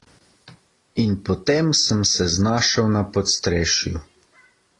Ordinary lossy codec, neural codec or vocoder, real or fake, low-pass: AAC, 32 kbps; none; real; 10.8 kHz